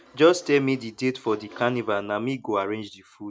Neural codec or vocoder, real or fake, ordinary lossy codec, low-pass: none; real; none; none